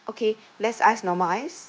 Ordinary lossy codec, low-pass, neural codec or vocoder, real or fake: none; none; codec, 16 kHz, 0.9 kbps, LongCat-Audio-Codec; fake